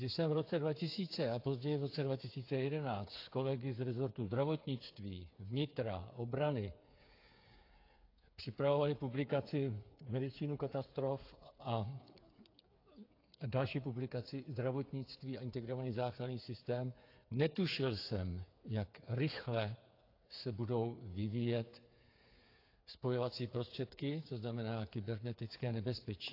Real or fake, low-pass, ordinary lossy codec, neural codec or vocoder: fake; 5.4 kHz; AAC, 32 kbps; codec, 16 kHz, 8 kbps, FreqCodec, smaller model